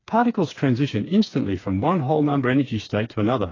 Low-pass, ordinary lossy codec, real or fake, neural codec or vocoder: 7.2 kHz; AAC, 32 kbps; fake; codec, 16 kHz, 4 kbps, FreqCodec, smaller model